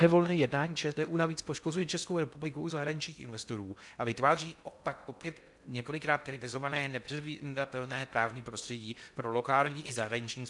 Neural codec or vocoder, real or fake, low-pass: codec, 16 kHz in and 24 kHz out, 0.6 kbps, FocalCodec, streaming, 2048 codes; fake; 10.8 kHz